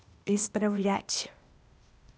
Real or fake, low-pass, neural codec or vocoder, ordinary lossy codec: fake; none; codec, 16 kHz, 0.8 kbps, ZipCodec; none